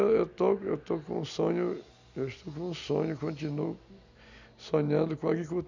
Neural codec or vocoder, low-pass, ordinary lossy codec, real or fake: none; 7.2 kHz; none; real